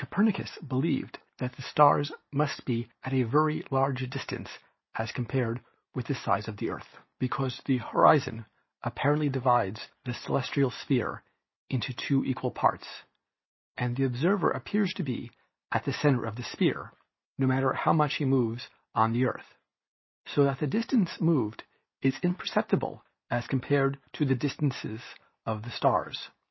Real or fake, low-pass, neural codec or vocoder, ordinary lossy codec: real; 7.2 kHz; none; MP3, 24 kbps